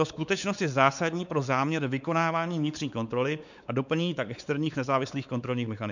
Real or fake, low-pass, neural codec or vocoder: fake; 7.2 kHz; codec, 16 kHz, 4 kbps, X-Codec, WavLM features, trained on Multilingual LibriSpeech